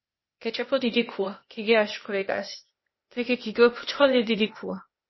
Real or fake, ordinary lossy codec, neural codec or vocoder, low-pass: fake; MP3, 24 kbps; codec, 16 kHz, 0.8 kbps, ZipCodec; 7.2 kHz